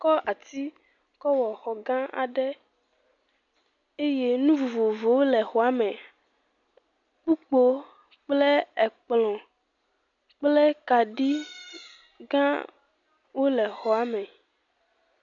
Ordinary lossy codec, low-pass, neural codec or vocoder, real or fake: MP3, 64 kbps; 7.2 kHz; none; real